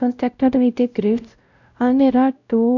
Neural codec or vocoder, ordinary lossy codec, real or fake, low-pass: codec, 16 kHz, 0.5 kbps, X-Codec, WavLM features, trained on Multilingual LibriSpeech; none; fake; 7.2 kHz